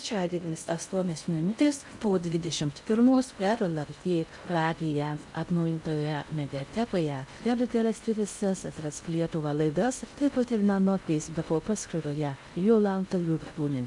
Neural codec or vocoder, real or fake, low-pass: codec, 16 kHz in and 24 kHz out, 0.6 kbps, FocalCodec, streaming, 2048 codes; fake; 10.8 kHz